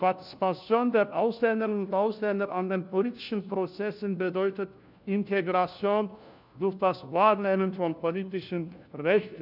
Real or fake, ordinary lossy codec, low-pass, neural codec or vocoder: fake; none; 5.4 kHz; codec, 16 kHz, 1 kbps, FunCodec, trained on LibriTTS, 50 frames a second